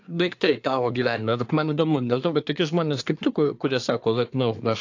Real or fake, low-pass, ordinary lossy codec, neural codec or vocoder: fake; 7.2 kHz; AAC, 48 kbps; codec, 24 kHz, 1 kbps, SNAC